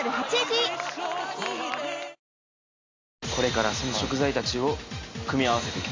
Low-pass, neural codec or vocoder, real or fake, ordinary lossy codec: 7.2 kHz; none; real; MP3, 64 kbps